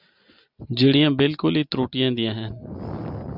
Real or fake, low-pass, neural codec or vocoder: real; 5.4 kHz; none